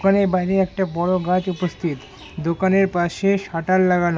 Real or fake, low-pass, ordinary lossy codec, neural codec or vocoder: real; none; none; none